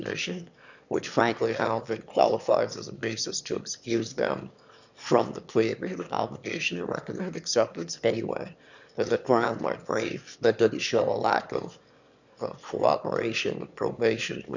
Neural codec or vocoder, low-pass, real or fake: autoencoder, 22.05 kHz, a latent of 192 numbers a frame, VITS, trained on one speaker; 7.2 kHz; fake